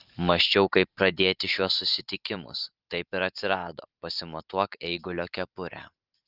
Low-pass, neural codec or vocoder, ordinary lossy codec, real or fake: 5.4 kHz; vocoder, 44.1 kHz, 128 mel bands every 512 samples, BigVGAN v2; Opus, 24 kbps; fake